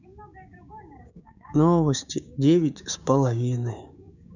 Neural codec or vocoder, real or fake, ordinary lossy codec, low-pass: codec, 16 kHz, 6 kbps, DAC; fake; none; 7.2 kHz